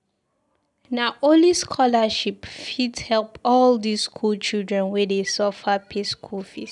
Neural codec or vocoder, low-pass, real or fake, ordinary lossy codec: none; 10.8 kHz; real; none